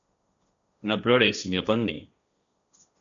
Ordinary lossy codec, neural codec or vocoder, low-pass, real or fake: MP3, 96 kbps; codec, 16 kHz, 1.1 kbps, Voila-Tokenizer; 7.2 kHz; fake